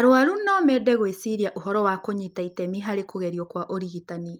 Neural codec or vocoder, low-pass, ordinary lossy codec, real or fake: none; 19.8 kHz; Opus, 32 kbps; real